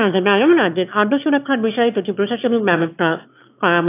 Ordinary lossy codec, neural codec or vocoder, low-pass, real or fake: AAC, 24 kbps; autoencoder, 22.05 kHz, a latent of 192 numbers a frame, VITS, trained on one speaker; 3.6 kHz; fake